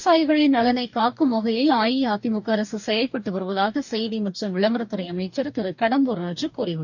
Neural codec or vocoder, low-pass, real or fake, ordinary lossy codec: codec, 44.1 kHz, 2.6 kbps, DAC; 7.2 kHz; fake; none